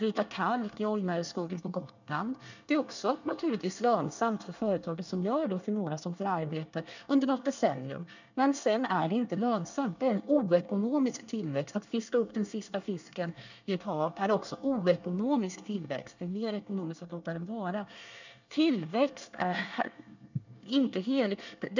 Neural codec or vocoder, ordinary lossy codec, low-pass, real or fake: codec, 24 kHz, 1 kbps, SNAC; none; 7.2 kHz; fake